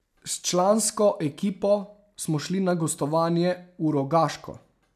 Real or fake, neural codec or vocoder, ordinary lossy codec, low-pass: real; none; none; 14.4 kHz